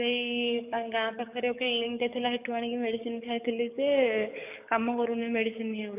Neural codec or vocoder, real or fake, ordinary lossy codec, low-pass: codec, 44.1 kHz, 7.8 kbps, DAC; fake; none; 3.6 kHz